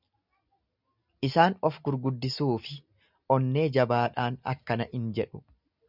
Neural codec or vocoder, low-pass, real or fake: none; 5.4 kHz; real